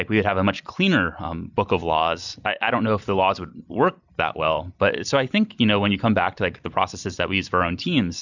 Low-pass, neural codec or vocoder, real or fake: 7.2 kHz; none; real